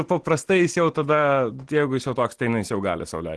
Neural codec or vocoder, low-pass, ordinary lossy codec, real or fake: none; 10.8 kHz; Opus, 16 kbps; real